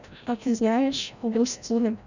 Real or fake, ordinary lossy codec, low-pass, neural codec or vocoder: fake; none; 7.2 kHz; codec, 16 kHz, 0.5 kbps, FreqCodec, larger model